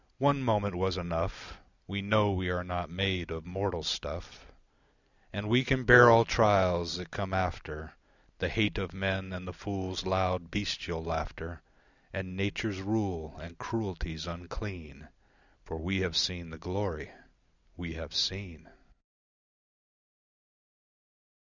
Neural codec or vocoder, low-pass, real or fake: none; 7.2 kHz; real